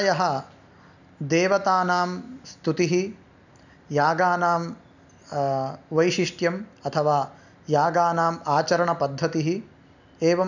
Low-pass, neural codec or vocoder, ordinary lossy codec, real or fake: 7.2 kHz; none; none; real